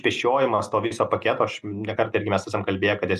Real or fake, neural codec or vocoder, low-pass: real; none; 14.4 kHz